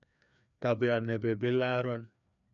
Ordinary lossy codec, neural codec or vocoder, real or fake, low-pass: MP3, 96 kbps; codec, 16 kHz, 2 kbps, FreqCodec, larger model; fake; 7.2 kHz